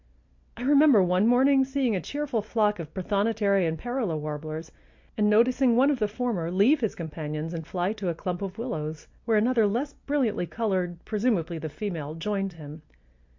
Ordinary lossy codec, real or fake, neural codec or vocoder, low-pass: MP3, 48 kbps; real; none; 7.2 kHz